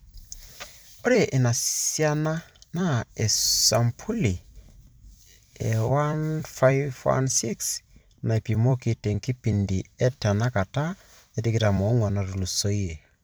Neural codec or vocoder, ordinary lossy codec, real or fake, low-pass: vocoder, 44.1 kHz, 128 mel bands every 256 samples, BigVGAN v2; none; fake; none